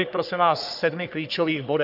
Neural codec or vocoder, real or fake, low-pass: codec, 44.1 kHz, 3.4 kbps, Pupu-Codec; fake; 5.4 kHz